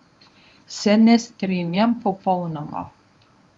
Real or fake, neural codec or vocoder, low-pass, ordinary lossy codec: fake; codec, 24 kHz, 0.9 kbps, WavTokenizer, medium speech release version 1; 10.8 kHz; none